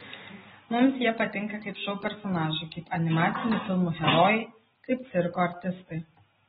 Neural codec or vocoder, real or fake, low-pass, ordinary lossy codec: none; real; 19.8 kHz; AAC, 16 kbps